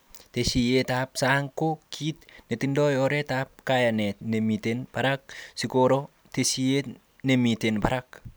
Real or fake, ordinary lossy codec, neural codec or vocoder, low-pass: real; none; none; none